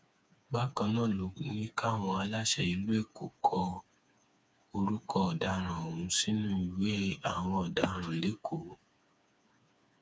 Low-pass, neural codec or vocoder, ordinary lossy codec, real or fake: none; codec, 16 kHz, 4 kbps, FreqCodec, smaller model; none; fake